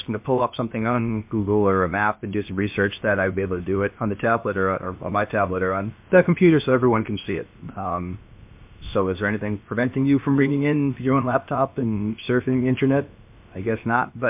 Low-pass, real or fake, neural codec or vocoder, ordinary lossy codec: 3.6 kHz; fake; codec, 16 kHz, about 1 kbps, DyCAST, with the encoder's durations; MP3, 32 kbps